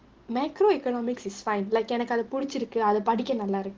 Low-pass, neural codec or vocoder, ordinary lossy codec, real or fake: 7.2 kHz; none; Opus, 16 kbps; real